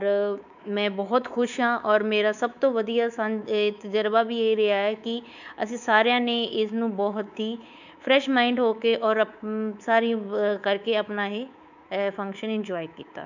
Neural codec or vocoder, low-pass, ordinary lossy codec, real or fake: codec, 24 kHz, 3.1 kbps, DualCodec; 7.2 kHz; none; fake